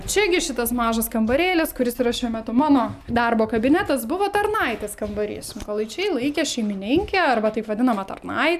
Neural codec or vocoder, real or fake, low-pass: none; real; 14.4 kHz